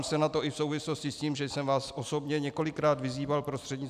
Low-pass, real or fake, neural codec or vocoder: 14.4 kHz; real; none